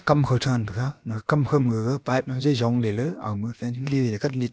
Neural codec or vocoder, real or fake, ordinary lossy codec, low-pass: codec, 16 kHz, about 1 kbps, DyCAST, with the encoder's durations; fake; none; none